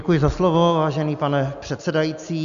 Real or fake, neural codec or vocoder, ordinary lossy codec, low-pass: real; none; AAC, 96 kbps; 7.2 kHz